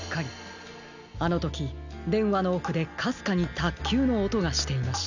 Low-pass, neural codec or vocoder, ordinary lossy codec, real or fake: 7.2 kHz; none; none; real